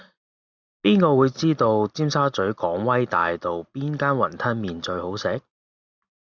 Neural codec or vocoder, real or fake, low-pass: none; real; 7.2 kHz